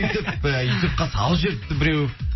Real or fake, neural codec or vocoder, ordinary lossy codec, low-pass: real; none; MP3, 24 kbps; 7.2 kHz